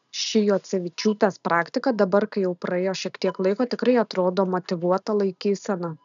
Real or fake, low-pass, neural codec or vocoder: real; 7.2 kHz; none